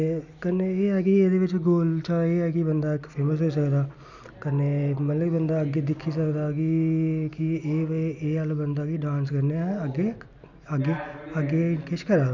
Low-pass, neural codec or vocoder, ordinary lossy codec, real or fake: 7.2 kHz; none; none; real